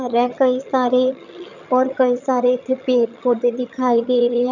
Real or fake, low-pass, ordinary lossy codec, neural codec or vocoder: fake; 7.2 kHz; none; vocoder, 22.05 kHz, 80 mel bands, HiFi-GAN